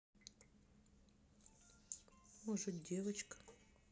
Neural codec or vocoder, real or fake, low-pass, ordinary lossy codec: none; real; none; none